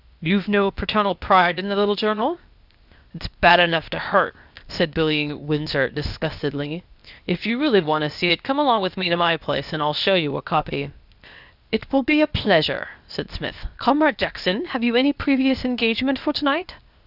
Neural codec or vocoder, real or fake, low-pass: codec, 16 kHz, 0.8 kbps, ZipCodec; fake; 5.4 kHz